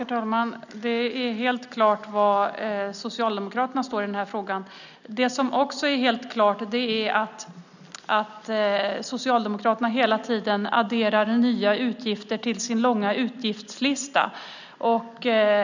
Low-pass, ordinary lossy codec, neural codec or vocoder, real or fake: 7.2 kHz; none; none; real